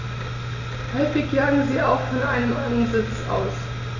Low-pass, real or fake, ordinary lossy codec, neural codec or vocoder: 7.2 kHz; real; none; none